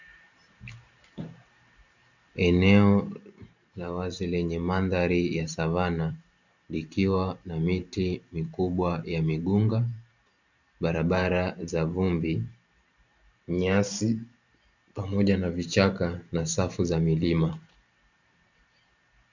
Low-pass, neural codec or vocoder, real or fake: 7.2 kHz; vocoder, 44.1 kHz, 128 mel bands every 512 samples, BigVGAN v2; fake